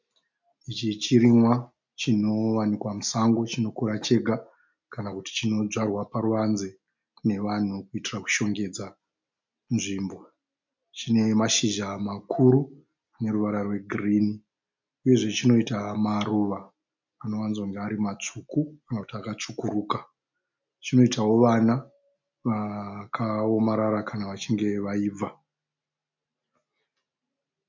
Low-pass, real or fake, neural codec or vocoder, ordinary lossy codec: 7.2 kHz; real; none; MP3, 64 kbps